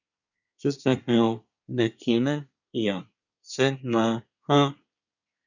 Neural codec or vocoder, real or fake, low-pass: codec, 24 kHz, 1 kbps, SNAC; fake; 7.2 kHz